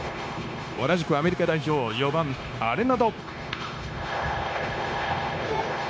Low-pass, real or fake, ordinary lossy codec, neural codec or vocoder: none; fake; none; codec, 16 kHz, 0.9 kbps, LongCat-Audio-Codec